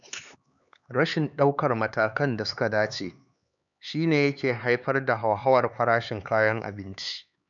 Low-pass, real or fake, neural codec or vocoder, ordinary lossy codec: 7.2 kHz; fake; codec, 16 kHz, 4 kbps, X-Codec, HuBERT features, trained on LibriSpeech; none